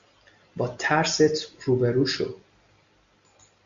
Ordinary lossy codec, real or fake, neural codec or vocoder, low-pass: Opus, 64 kbps; real; none; 7.2 kHz